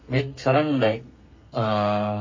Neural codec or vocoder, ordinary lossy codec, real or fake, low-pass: codec, 32 kHz, 1.9 kbps, SNAC; MP3, 32 kbps; fake; 7.2 kHz